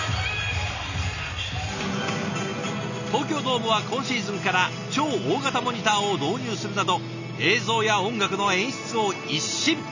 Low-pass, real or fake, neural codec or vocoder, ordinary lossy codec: 7.2 kHz; real; none; none